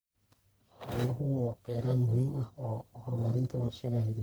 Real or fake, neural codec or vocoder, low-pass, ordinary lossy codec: fake; codec, 44.1 kHz, 1.7 kbps, Pupu-Codec; none; none